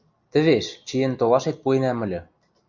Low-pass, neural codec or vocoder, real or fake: 7.2 kHz; none; real